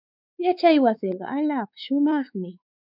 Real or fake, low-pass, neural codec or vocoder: fake; 5.4 kHz; codec, 16 kHz, 4 kbps, X-Codec, WavLM features, trained on Multilingual LibriSpeech